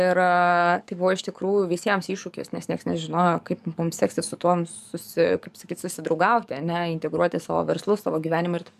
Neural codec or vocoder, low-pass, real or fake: codec, 44.1 kHz, 7.8 kbps, DAC; 14.4 kHz; fake